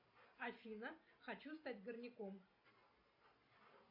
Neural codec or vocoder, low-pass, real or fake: none; 5.4 kHz; real